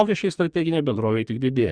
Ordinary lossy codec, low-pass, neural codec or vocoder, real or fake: Opus, 64 kbps; 9.9 kHz; codec, 44.1 kHz, 2.6 kbps, SNAC; fake